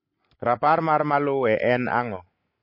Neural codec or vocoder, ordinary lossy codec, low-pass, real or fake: none; MP3, 32 kbps; 5.4 kHz; real